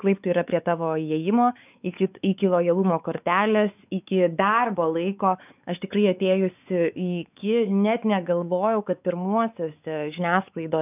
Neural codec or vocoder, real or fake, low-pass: codec, 16 kHz, 4 kbps, X-Codec, WavLM features, trained on Multilingual LibriSpeech; fake; 3.6 kHz